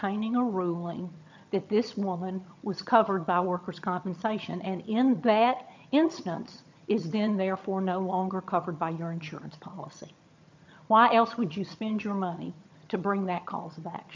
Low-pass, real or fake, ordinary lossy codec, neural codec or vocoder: 7.2 kHz; fake; MP3, 64 kbps; vocoder, 22.05 kHz, 80 mel bands, HiFi-GAN